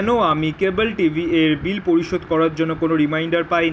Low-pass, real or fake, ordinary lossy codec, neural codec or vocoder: none; real; none; none